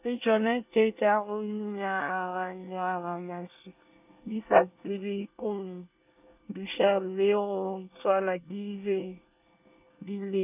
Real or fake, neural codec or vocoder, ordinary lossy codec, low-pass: fake; codec, 24 kHz, 1 kbps, SNAC; none; 3.6 kHz